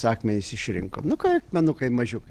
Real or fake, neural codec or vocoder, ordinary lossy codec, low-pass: real; none; Opus, 16 kbps; 14.4 kHz